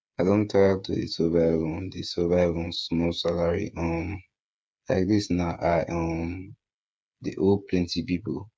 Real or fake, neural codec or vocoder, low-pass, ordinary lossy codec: fake; codec, 16 kHz, 8 kbps, FreqCodec, smaller model; none; none